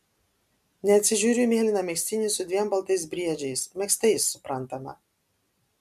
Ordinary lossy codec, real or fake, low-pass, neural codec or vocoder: MP3, 96 kbps; real; 14.4 kHz; none